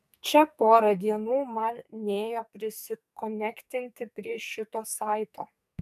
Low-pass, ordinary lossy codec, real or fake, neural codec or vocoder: 14.4 kHz; AAC, 96 kbps; fake; codec, 44.1 kHz, 2.6 kbps, SNAC